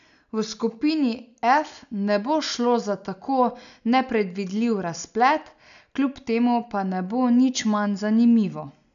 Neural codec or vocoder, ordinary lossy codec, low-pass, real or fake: none; none; 7.2 kHz; real